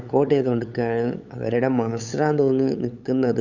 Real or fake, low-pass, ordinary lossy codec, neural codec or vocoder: fake; 7.2 kHz; none; codec, 16 kHz, 8 kbps, FunCodec, trained on LibriTTS, 25 frames a second